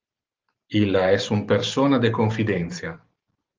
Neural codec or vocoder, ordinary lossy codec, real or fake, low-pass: vocoder, 44.1 kHz, 128 mel bands every 512 samples, BigVGAN v2; Opus, 32 kbps; fake; 7.2 kHz